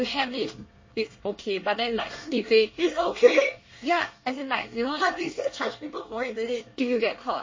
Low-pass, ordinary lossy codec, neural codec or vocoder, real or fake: 7.2 kHz; MP3, 32 kbps; codec, 24 kHz, 1 kbps, SNAC; fake